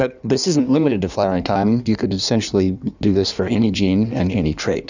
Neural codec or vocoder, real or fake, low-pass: codec, 16 kHz in and 24 kHz out, 1.1 kbps, FireRedTTS-2 codec; fake; 7.2 kHz